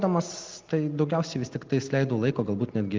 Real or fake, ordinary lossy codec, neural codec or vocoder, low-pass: real; Opus, 32 kbps; none; 7.2 kHz